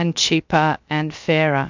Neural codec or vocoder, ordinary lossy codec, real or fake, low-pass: codec, 16 kHz, 0.7 kbps, FocalCodec; MP3, 64 kbps; fake; 7.2 kHz